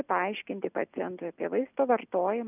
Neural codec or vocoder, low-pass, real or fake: none; 3.6 kHz; real